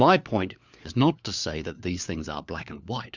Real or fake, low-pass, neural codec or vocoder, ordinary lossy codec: fake; 7.2 kHz; codec, 16 kHz, 4 kbps, FunCodec, trained on LibriTTS, 50 frames a second; Opus, 64 kbps